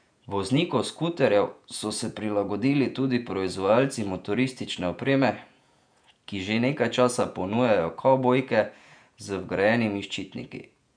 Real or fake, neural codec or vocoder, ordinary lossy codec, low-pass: fake; vocoder, 48 kHz, 128 mel bands, Vocos; none; 9.9 kHz